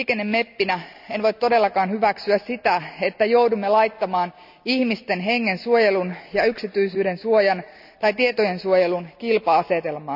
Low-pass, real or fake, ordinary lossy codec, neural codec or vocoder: 5.4 kHz; real; none; none